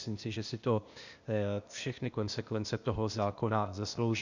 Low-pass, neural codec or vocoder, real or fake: 7.2 kHz; codec, 16 kHz, 0.8 kbps, ZipCodec; fake